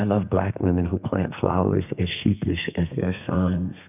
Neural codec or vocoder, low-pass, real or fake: codec, 32 kHz, 1.9 kbps, SNAC; 3.6 kHz; fake